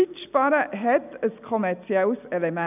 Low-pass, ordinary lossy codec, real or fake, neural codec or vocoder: 3.6 kHz; none; real; none